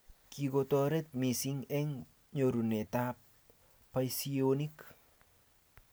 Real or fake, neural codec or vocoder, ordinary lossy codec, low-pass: real; none; none; none